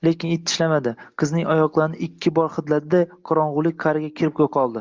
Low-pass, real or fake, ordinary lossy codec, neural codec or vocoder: 7.2 kHz; real; Opus, 32 kbps; none